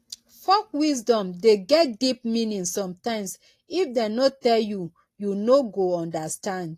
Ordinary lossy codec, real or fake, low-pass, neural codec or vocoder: AAC, 48 kbps; real; 14.4 kHz; none